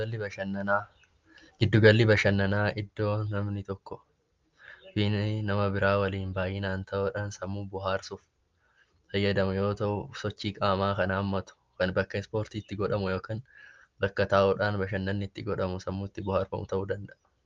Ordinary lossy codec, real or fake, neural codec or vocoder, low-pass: Opus, 32 kbps; real; none; 7.2 kHz